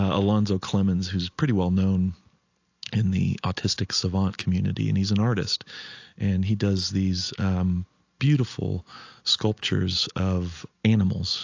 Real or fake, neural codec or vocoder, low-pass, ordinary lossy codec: real; none; 7.2 kHz; AAC, 48 kbps